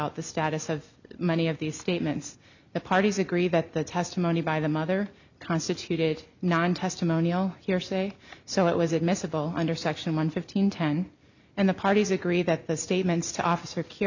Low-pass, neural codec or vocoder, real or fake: 7.2 kHz; none; real